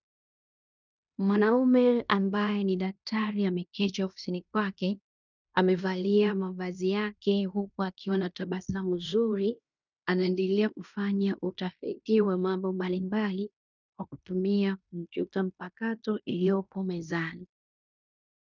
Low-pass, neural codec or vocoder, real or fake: 7.2 kHz; codec, 16 kHz in and 24 kHz out, 0.9 kbps, LongCat-Audio-Codec, fine tuned four codebook decoder; fake